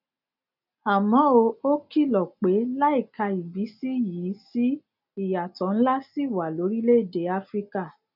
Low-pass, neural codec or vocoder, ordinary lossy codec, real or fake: 5.4 kHz; none; none; real